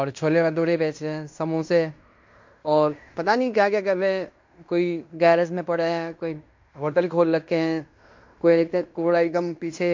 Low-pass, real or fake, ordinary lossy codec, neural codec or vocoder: 7.2 kHz; fake; MP3, 48 kbps; codec, 16 kHz in and 24 kHz out, 0.9 kbps, LongCat-Audio-Codec, fine tuned four codebook decoder